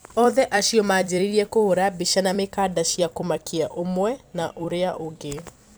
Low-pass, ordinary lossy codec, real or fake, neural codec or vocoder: none; none; fake; vocoder, 44.1 kHz, 128 mel bands every 256 samples, BigVGAN v2